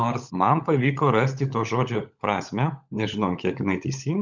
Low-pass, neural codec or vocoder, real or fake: 7.2 kHz; codec, 16 kHz, 16 kbps, FunCodec, trained on LibriTTS, 50 frames a second; fake